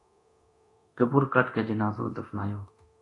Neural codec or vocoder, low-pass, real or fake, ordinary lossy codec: codec, 24 kHz, 0.9 kbps, DualCodec; 10.8 kHz; fake; AAC, 48 kbps